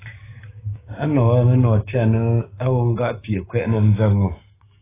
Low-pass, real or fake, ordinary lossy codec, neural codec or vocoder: 3.6 kHz; fake; AAC, 16 kbps; codec, 16 kHz, 6 kbps, DAC